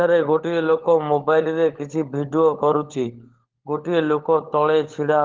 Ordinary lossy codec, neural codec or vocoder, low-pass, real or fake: Opus, 16 kbps; codec, 16 kHz, 16 kbps, FunCodec, trained on LibriTTS, 50 frames a second; 7.2 kHz; fake